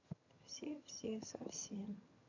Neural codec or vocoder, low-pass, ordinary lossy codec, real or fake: vocoder, 22.05 kHz, 80 mel bands, HiFi-GAN; 7.2 kHz; none; fake